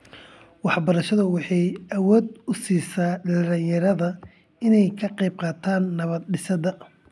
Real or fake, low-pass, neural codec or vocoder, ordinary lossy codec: real; none; none; none